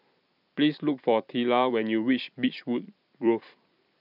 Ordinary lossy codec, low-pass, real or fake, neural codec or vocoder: none; 5.4 kHz; fake; vocoder, 44.1 kHz, 128 mel bands every 256 samples, BigVGAN v2